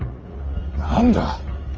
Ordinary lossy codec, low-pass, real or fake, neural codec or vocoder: Opus, 24 kbps; 7.2 kHz; fake; vocoder, 44.1 kHz, 80 mel bands, Vocos